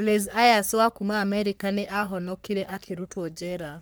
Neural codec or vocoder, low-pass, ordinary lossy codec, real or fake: codec, 44.1 kHz, 3.4 kbps, Pupu-Codec; none; none; fake